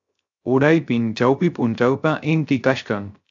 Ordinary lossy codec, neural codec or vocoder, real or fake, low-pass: AAC, 64 kbps; codec, 16 kHz, 0.3 kbps, FocalCodec; fake; 7.2 kHz